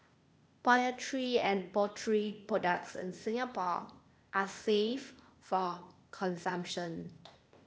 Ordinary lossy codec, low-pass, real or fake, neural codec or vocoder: none; none; fake; codec, 16 kHz, 0.8 kbps, ZipCodec